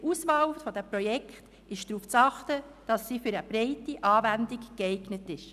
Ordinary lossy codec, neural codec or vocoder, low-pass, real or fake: none; none; 14.4 kHz; real